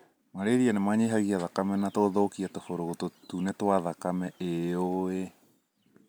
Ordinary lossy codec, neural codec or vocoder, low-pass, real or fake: none; none; none; real